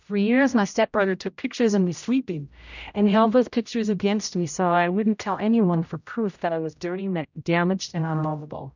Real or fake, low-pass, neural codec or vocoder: fake; 7.2 kHz; codec, 16 kHz, 0.5 kbps, X-Codec, HuBERT features, trained on general audio